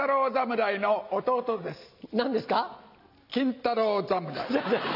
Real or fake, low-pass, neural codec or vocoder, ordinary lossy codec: real; 5.4 kHz; none; none